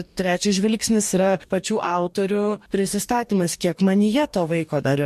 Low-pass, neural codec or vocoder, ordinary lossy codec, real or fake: 14.4 kHz; codec, 44.1 kHz, 2.6 kbps, DAC; MP3, 64 kbps; fake